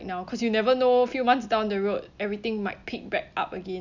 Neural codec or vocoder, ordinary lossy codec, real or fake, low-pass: none; none; real; 7.2 kHz